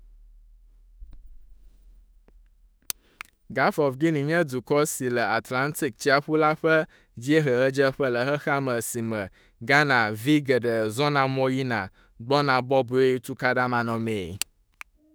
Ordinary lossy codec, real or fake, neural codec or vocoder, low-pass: none; fake; autoencoder, 48 kHz, 32 numbers a frame, DAC-VAE, trained on Japanese speech; none